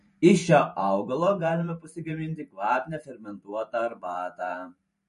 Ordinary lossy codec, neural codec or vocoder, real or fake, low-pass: MP3, 48 kbps; vocoder, 44.1 kHz, 128 mel bands every 512 samples, BigVGAN v2; fake; 14.4 kHz